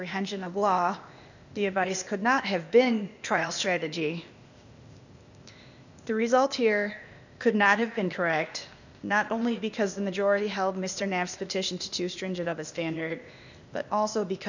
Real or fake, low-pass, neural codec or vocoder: fake; 7.2 kHz; codec, 16 kHz, 0.8 kbps, ZipCodec